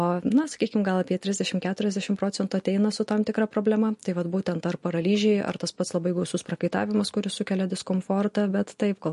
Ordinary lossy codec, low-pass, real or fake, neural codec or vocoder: MP3, 48 kbps; 14.4 kHz; real; none